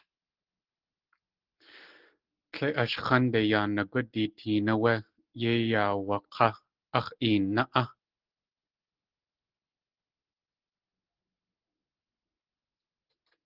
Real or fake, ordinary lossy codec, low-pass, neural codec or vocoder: real; Opus, 16 kbps; 5.4 kHz; none